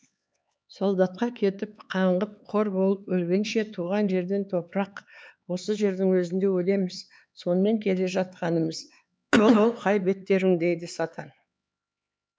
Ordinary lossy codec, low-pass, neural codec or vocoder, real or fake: none; none; codec, 16 kHz, 4 kbps, X-Codec, HuBERT features, trained on LibriSpeech; fake